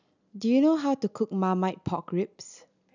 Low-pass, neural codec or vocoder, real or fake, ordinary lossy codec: 7.2 kHz; none; real; none